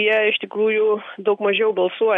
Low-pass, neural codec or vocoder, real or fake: 10.8 kHz; none; real